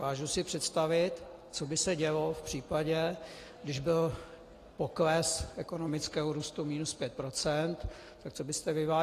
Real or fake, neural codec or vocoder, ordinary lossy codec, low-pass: real; none; AAC, 48 kbps; 14.4 kHz